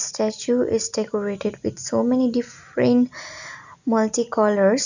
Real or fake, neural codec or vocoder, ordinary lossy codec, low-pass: real; none; none; 7.2 kHz